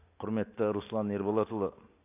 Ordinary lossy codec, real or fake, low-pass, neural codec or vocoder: AAC, 24 kbps; real; 3.6 kHz; none